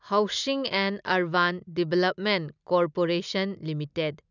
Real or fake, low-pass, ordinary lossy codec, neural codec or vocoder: real; 7.2 kHz; none; none